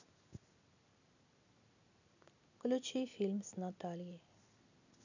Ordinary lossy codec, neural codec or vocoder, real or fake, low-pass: none; none; real; 7.2 kHz